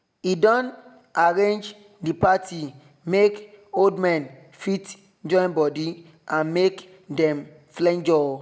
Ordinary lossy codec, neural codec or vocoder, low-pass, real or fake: none; none; none; real